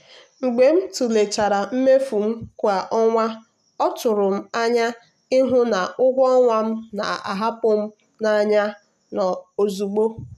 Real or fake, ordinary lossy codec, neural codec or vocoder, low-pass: real; none; none; 14.4 kHz